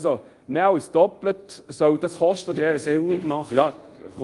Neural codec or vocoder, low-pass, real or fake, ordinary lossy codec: codec, 24 kHz, 0.5 kbps, DualCodec; 10.8 kHz; fake; Opus, 32 kbps